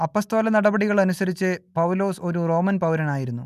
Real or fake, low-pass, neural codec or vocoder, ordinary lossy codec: real; 14.4 kHz; none; none